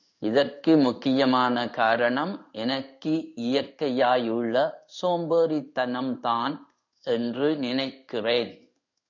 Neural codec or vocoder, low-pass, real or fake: codec, 16 kHz in and 24 kHz out, 1 kbps, XY-Tokenizer; 7.2 kHz; fake